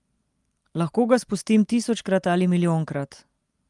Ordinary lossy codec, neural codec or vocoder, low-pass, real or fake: Opus, 32 kbps; none; 10.8 kHz; real